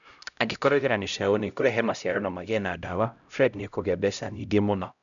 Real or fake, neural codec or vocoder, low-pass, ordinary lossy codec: fake; codec, 16 kHz, 0.5 kbps, X-Codec, HuBERT features, trained on LibriSpeech; 7.2 kHz; none